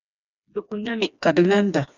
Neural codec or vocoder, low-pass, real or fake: codec, 16 kHz, 2 kbps, FreqCodec, smaller model; 7.2 kHz; fake